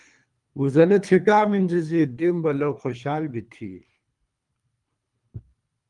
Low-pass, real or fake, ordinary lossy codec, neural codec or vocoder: 10.8 kHz; fake; Opus, 32 kbps; codec, 24 kHz, 3 kbps, HILCodec